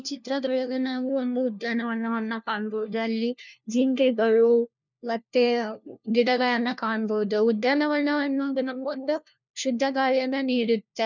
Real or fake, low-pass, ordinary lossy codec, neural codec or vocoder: fake; 7.2 kHz; none; codec, 16 kHz, 1 kbps, FunCodec, trained on LibriTTS, 50 frames a second